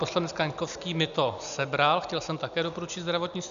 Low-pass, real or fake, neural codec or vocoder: 7.2 kHz; real; none